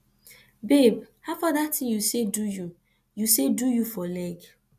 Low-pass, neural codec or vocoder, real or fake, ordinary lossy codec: 14.4 kHz; none; real; none